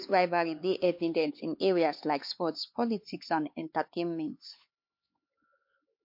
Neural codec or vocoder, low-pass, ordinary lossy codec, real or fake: codec, 16 kHz, 4 kbps, X-Codec, HuBERT features, trained on LibriSpeech; 5.4 kHz; MP3, 32 kbps; fake